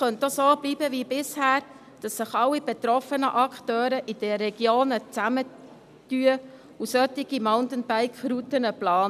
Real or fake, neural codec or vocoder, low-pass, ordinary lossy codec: real; none; 14.4 kHz; none